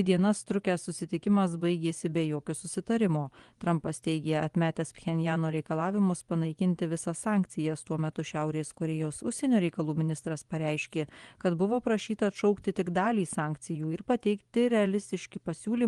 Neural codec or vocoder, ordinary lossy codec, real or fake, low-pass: vocoder, 24 kHz, 100 mel bands, Vocos; Opus, 24 kbps; fake; 10.8 kHz